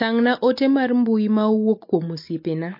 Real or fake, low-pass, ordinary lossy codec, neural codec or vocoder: real; 5.4 kHz; MP3, 32 kbps; none